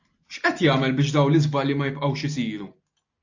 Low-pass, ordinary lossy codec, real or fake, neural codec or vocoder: 7.2 kHz; AAC, 48 kbps; real; none